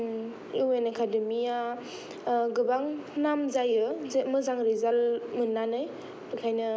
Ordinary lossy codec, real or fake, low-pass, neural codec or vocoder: none; real; none; none